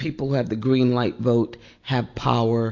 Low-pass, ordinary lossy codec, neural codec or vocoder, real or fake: 7.2 kHz; AAC, 48 kbps; none; real